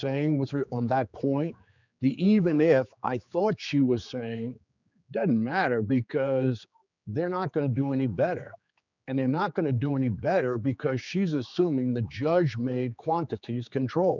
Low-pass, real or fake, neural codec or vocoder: 7.2 kHz; fake; codec, 16 kHz, 4 kbps, X-Codec, HuBERT features, trained on general audio